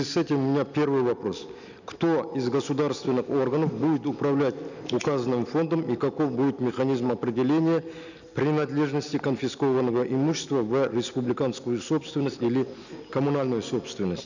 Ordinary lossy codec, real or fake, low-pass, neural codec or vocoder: none; real; 7.2 kHz; none